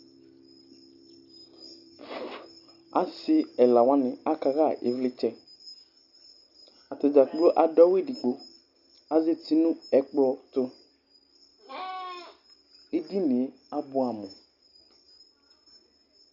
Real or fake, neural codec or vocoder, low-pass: real; none; 5.4 kHz